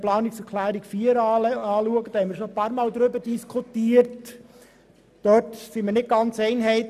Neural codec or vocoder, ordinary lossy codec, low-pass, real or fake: none; none; 14.4 kHz; real